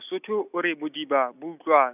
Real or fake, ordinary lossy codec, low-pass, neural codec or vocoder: real; none; 3.6 kHz; none